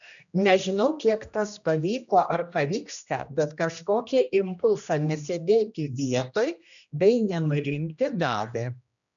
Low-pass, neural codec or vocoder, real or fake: 7.2 kHz; codec, 16 kHz, 1 kbps, X-Codec, HuBERT features, trained on general audio; fake